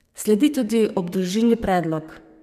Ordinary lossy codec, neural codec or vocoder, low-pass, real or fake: none; codec, 32 kHz, 1.9 kbps, SNAC; 14.4 kHz; fake